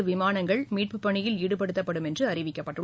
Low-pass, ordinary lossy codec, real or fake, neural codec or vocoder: none; none; real; none